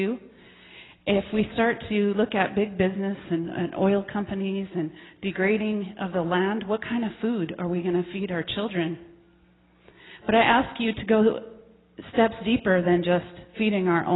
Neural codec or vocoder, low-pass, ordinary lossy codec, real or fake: none; 7.2 kHz; AAC, 16 kbps; real